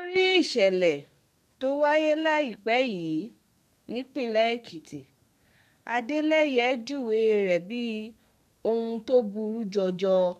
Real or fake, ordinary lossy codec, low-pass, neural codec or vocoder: fake; none; 14.4 kHz; codec, 32 kHz, 1.9 kbps, SNAC